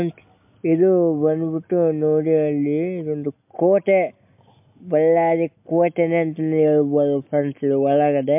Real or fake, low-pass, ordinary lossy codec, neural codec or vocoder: real; 3.6 kHz; MP3, 32 kbps; none